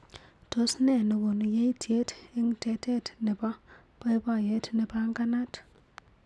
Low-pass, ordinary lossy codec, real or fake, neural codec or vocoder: none; none; real; none